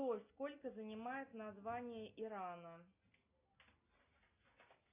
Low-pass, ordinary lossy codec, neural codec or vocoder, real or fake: 3.6 kHz; AAC, 32 kbps; none; real